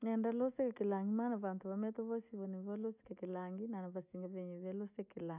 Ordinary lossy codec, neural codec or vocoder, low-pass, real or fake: none; none; 3.6 kHz; real